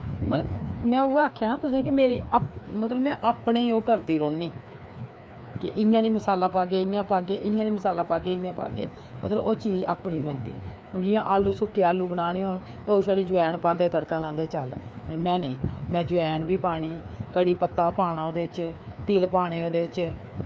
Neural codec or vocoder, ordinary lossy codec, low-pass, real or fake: codec, 16 kHz, 2 kbps, FreqCodec, larger model; none; none; fake